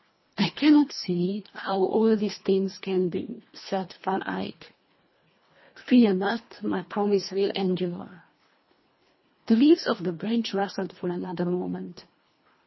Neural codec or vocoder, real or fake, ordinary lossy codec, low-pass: codec, 24 kHz, 1.5 kbps, HILCodec; fake; MP3, 24 kbps; 7.2 kHz